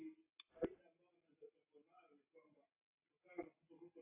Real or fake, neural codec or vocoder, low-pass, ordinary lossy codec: real; none; 3.6 kHz; AAC, 32 kbps